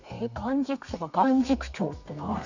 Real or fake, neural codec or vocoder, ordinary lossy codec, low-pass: fake; codec, 32 kHz, 1.9 kbps, SNAC; none; 7.2 kHz